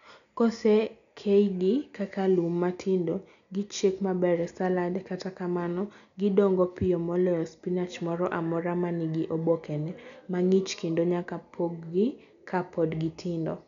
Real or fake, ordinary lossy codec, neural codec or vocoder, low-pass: real; none; none; 7.2 kHz